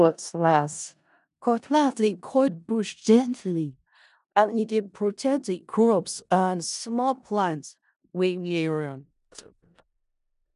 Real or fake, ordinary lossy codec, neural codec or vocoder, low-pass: fake; none; codec, 16 kHz in and 24 kHz out, 0.4 kbps, LongCat-Audio-Codec, four codebook decoder; 10.8 kHz